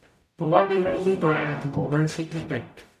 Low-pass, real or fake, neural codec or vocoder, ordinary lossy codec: 14.4 kHz; fake; codec, 44.1 kHz, 0.9 kbps, DAC; none